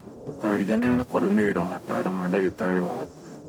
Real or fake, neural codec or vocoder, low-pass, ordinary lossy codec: fake; codec, 44.1 kHz, 0.9 kbps, DAC; 19.8 kHz; none